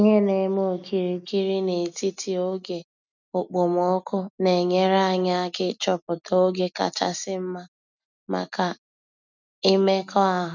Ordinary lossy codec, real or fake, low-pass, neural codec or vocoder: none; real; 7.2 kHz; none